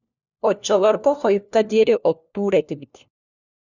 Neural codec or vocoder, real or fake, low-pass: codec, 16 kHz, 1 kbps, FunCodec, trained on LibriTTS, 50 frames a second; fake; 7.2 kHz